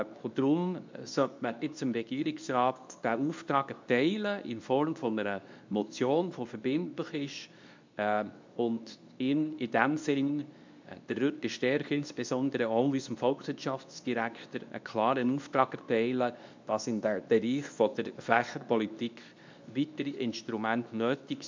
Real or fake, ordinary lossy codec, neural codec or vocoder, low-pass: fake; MP3, 64 kbps; codec, 24 kHz, 0.9 kbps, WavTokenizer, medium speech release version 2; 7.2 kHz